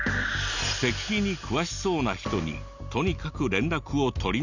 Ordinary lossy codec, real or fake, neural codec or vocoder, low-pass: none; real; none; 7.2 kHz